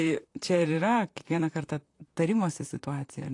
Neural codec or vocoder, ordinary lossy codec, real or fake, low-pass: vocoder, 44.1 kHz, 128 mel bands, Pupu-Vocoder; AAC, 48 kbps; fake; 10.8 kHz